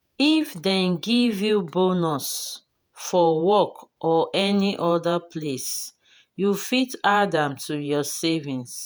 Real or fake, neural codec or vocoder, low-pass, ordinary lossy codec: fake; vocoder, 48 kHz, 128 mel bands, Vocos; none; none